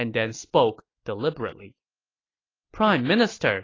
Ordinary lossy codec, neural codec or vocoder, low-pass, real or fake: AAC, 32 kbps; none; 7.2 kHz; real